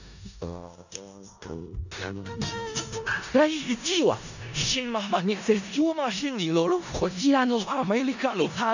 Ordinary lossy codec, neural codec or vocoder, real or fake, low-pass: none; codec, 16 kHz in and 24 kHz out, 0.4 kbps, LongCat-Audio-Codec, four codebook decoder; fake; 7.2 kHz